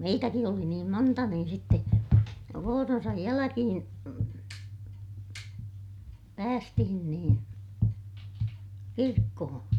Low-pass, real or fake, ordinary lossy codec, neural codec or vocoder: 19.8 kHz; real; none; none